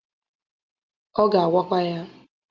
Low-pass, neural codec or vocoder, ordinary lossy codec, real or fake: 7.2 kHz; none; Opus, 32 kbps; real